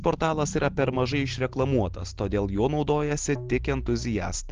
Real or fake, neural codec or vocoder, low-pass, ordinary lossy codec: real; none; 7.2 kHz; Opus, 16 kbps